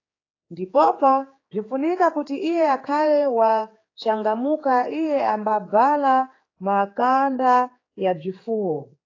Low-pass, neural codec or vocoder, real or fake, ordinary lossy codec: 7.2 kHz; codec, 16 kHz, 4 kbps, X-Codec, HuBERT features, trained on general audio; fake; AAC, 32 kbps